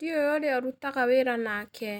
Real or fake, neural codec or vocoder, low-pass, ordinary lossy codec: real; none; 19.8 kHz; none